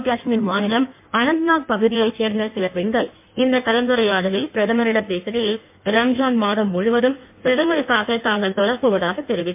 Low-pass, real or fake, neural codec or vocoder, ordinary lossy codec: 3.6 kHz; fake; codec, 16 kHz in and 24 kHz out, 1.1 kbps, FireRedTTS-2 codec; MP3, 32 kbps